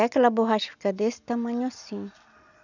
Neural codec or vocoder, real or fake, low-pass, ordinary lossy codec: none; real; 7.2 kHz; none